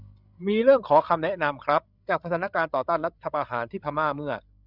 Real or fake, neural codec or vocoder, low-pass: fake; codec, 16 kHz, 16 kbps, FreqCodec, larger model; 5.4 kHz